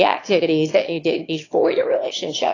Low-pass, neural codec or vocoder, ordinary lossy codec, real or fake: 7.2 kHz; autoencoder, 22.05 kHz, a latent of 192 numbers a frame, VITS, trained on one speaker; AAC, 32 kbps; fake